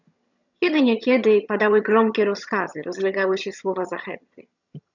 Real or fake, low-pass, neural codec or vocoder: fake; 7.2 kHz; vocoder, 22.05 kHz, 80 mel bands, HiFi-GAN